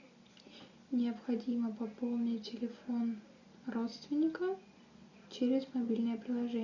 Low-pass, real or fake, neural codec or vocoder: 7.2 kHz; real; none